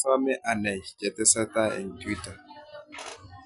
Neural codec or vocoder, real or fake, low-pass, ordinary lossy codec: none; real; 10.8 kHz; none